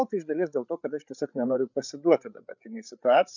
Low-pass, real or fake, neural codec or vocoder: 7.2 kHz; fake; codec, 16 kHz, 8 kbps, FreqCodec, larger model